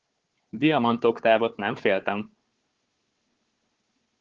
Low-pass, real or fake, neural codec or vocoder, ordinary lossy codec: 7.2 kHz; fake; codec, 16 kHz, 4 kbps, FunCodec, trained on Chinese and English, 50 frames a second; Opus, 16 kbps